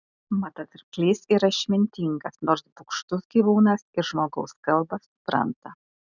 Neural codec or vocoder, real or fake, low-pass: none; real; 7.2 kHz